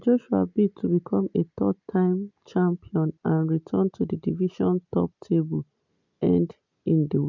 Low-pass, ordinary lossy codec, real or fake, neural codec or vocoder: 7.2 kHz; none; real; none